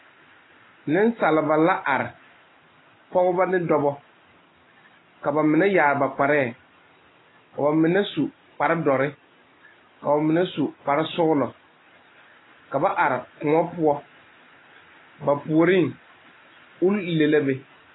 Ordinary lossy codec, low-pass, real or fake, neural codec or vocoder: AAC, 16 kbps; 7.2 kHz; real; none